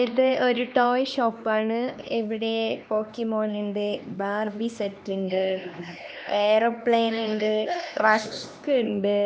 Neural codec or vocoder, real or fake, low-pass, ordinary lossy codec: codec, 16 kHz, 2 kbps, X-Codec, HuBERT features, trained on LibriSpeech; fake; none; none